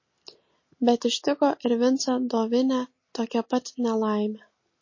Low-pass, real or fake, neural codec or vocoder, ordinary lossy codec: 7.2 kHz; real; none; MP3, 32 kbps